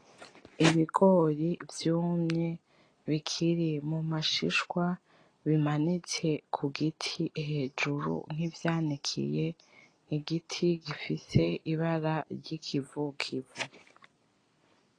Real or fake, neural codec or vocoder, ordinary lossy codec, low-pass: real; none; AAC, 32 kbps; 9.9 kHz